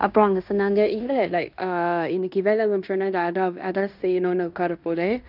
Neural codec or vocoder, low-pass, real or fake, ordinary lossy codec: codec, 16 kHz in and 24 kHz out, 0.9 kbps, LongCat-Audio-Codec, fine tuned four codebook decoder; 5.4 kHz; fake; none